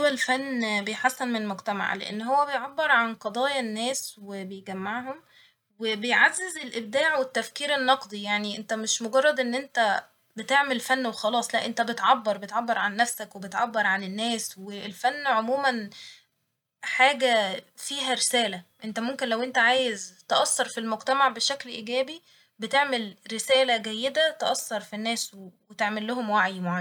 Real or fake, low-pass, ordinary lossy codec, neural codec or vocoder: real; 19.8 kHz; none; none